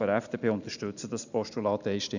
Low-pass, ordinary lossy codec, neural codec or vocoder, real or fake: 7.2 kHz; none; none; real